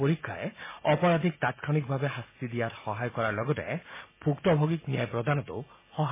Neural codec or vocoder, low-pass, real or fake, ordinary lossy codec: none; 3.6 kHz; real; MP3, 16 kbps